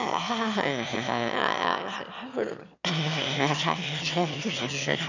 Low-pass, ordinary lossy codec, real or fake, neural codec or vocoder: 7.2 kHz; none; fake; autoencoder, 22.05 kHz, a latent of 192 numbers a frame, VITS, trained on one speaker